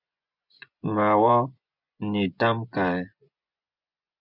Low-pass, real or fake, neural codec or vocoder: 5.4 kHz; fake; vocoder, 24 kHz, 100 mel bands, Vocos